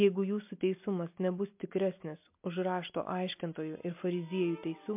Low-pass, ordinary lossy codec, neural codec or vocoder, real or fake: 3.6 kHz; MP3, 32 kbps; none; real